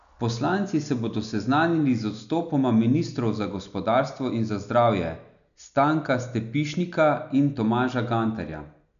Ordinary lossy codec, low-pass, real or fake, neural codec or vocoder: none; 7.2 kHz; real; none